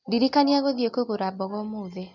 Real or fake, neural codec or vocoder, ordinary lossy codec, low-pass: real; none; none; 7.2 kHz